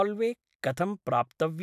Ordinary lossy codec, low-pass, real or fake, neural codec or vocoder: AAC, 96 kbps; 14.4 kHz; real; none